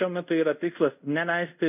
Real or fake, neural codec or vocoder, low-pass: fake; codec, 24 kHz, 0.5 kbps, DualCodec; 3.6 kHz